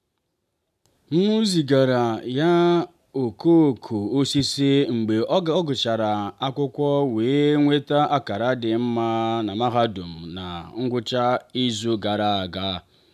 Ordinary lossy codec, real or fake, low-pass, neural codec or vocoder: none; real; 14.4 kHz; none